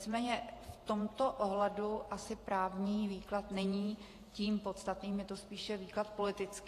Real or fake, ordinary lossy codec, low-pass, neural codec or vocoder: fake; AAC, 48 kbps; 14.4 kHz; vocoder, 48 kHz, 128 mel bands, Vocos